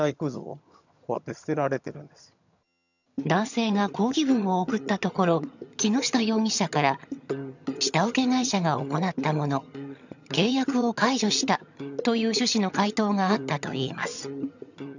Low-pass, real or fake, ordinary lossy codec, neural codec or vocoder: 7.2 kHz; fake; none; vocoder, 22.05 kHz, 80 mel bands, HiFi-GAN